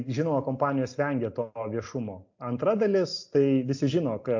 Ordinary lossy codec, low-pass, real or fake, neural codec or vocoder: MP3, 48 kbps; 7.2 kHz; real; none